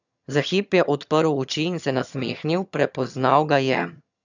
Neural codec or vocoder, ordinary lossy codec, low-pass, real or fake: vocoder, 22.05 kHz, 80 mel bands, HiFi-GAN; none; 7.2 kHz; fake